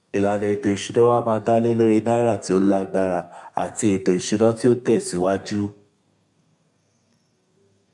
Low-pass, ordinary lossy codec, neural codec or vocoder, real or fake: 10.8 kHz; none; codec, 32 kHz, 1.9 kbps, SNAC; fake